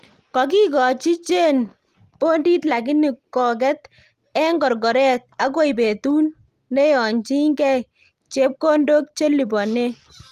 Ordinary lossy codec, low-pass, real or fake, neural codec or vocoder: Opus, 24 kbps; 14.4 kHz; real; none